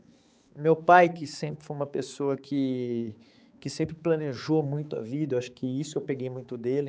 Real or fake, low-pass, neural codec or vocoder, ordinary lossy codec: fake; none; codec, 16 kHz, 4 kbps, X-Codec, HuBERT features, trained on balanced general audio; none